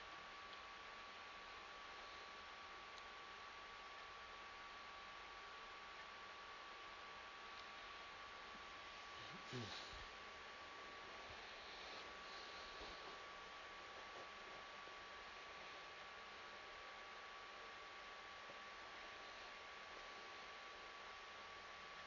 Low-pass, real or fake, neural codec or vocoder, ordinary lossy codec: 7.2 kHz; real; none; none